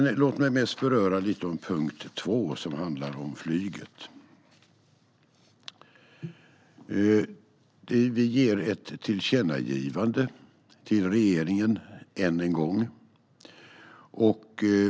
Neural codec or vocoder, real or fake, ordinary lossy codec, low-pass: none; real; none; none